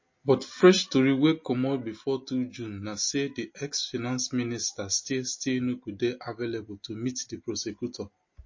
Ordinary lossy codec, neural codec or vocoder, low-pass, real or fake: MP3, 32 kbps; none; 7.2 kHz; real